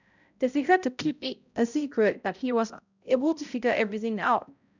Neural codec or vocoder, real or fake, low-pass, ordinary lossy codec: codec, 16 kHz, 0.5 kbps, X-Codec, HuBERT features, trained on balanced general audio; fake; 7.2 kHz; none